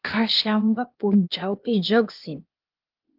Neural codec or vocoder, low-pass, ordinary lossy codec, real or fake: codec, 16 kHz, 0.8 kbps, ZipCodec; 5.4 kHz; Opus, 32 kbps; fake